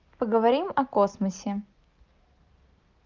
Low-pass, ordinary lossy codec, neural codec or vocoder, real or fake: 7.2 kHz; Opus, 24 kbps; none; real